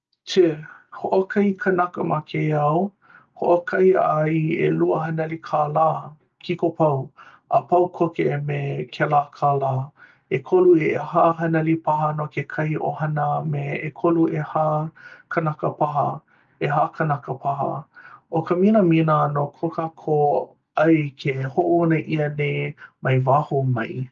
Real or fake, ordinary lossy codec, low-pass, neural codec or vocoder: real; Opus, 24 kbps; 7.2 kHz; none